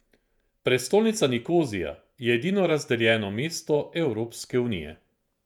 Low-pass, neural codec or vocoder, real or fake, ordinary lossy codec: 19.8 kHz; vocoder, 48 kHz, 128 mel bands, Vocos; fake; none